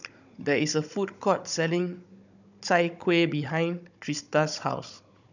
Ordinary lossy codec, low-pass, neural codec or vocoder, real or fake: none; 7.2 kHz; codec, 16 kHz, 16 kbps, FunCodec, trained on Chinese and English, 50 frames a second; fake